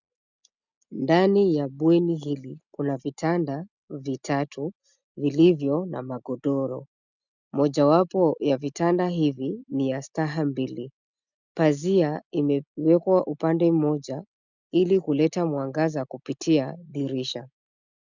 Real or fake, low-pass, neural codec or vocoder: real; 7.2 kHz; none